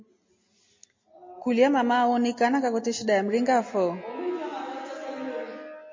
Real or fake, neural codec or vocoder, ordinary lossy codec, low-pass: real; none; MP3, 32 kbps; 7.2 kHz